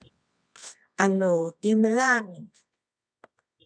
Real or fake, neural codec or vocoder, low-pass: fake; codec, 24 kHz, 0.9 kbps, WavTokenizer, medium music audio release; 9.9 kHz